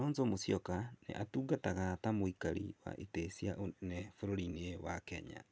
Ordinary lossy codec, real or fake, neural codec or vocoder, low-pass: none; real; none; none